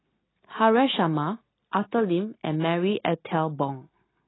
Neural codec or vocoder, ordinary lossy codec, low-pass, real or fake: none; AAC, 16 kbps; 7.2 kHz; real